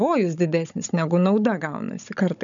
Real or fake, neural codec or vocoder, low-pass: fake; codec, 16 kHz, 16 kbps, FunCodec, trained on Chinese and English, 50 frames a second; 7.2 kHz